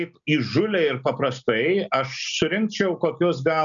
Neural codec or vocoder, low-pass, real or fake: none; 7.2 kHz; real